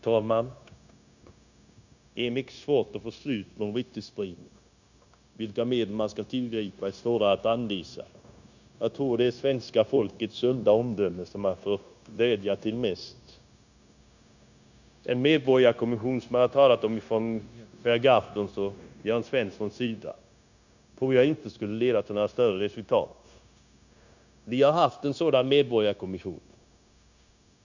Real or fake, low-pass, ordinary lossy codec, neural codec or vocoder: fake; 7.2 kHz; none; codec, 16 kHz, 0.9 kbps, LongCat-Audio-Codec